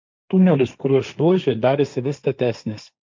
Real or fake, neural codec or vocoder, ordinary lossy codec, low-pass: fake; codec, 16 kHz, 1.1 kbps, Voila-Tokenizer; MP3, 64 kbps; 7.2 kHz